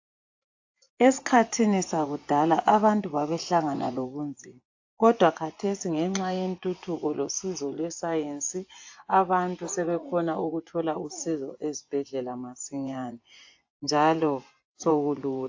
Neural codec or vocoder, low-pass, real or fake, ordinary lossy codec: vocoder, 24 kHz, 100 mel bands, Vocos; 7.2 kHz; fake; AAC, 48 kbps